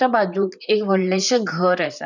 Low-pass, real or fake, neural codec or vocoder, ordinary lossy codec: 7.2 kHz; fake; vocoder, 44.1 kHz, 128 mel bands, Pupu-Vocoder; none